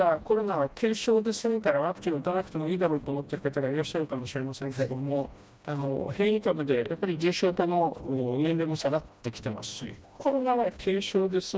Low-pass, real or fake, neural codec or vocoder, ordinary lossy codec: none; fake; codec, 16 kHz, 1 kbps, FreqCodec, smaller model; none